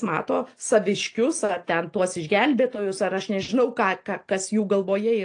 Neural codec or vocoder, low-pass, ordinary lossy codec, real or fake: none; 9.9 kHz; AAC, 48 kbps; real